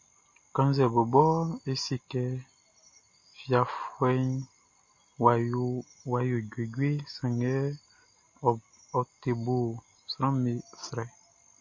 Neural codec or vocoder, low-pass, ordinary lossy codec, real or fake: none; 7.2 kHz; MP3, 32 kbps; real